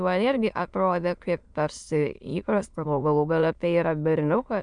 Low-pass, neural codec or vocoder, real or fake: 9.9 kHz; autoencoder, 22.05 kHz, a latent of 192 numbers a frame, VITS, trained on many speakers; fake